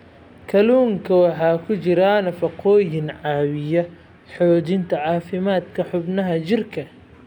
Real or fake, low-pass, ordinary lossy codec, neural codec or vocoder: real; 19.8 kHz; none; none